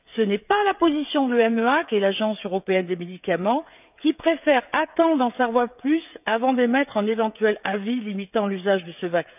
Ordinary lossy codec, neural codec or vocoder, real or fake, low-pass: none; codec, 16 kHz, 16 kbps, FreqCodec, smaller model; fake; 3.6 kHz